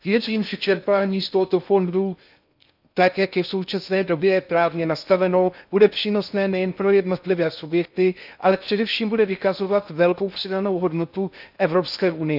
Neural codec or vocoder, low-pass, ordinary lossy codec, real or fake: codec, 16 kHz in and 24 kHz out, 0.6 kbps, FocalCodec, streaming, 2048 codes; 5.4 kHz; none; fake